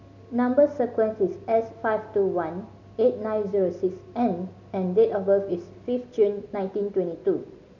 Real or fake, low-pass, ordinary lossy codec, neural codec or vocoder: real; 7.2 kHz; none; none